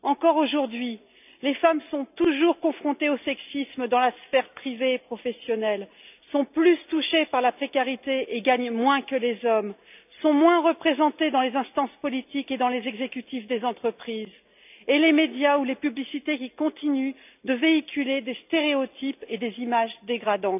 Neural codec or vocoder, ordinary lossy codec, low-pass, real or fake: none; none; 3.6 kHz; real